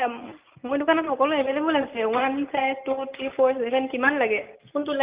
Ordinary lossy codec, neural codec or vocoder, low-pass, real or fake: Opus, 32 kbps; none; 3.6 kHz; real